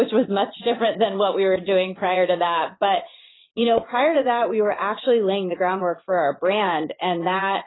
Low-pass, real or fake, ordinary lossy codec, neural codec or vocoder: 7.2 kHz; fake; AAC, 16 kbps; vocoder, 22.05 kHz, 80 mel bands, Vocos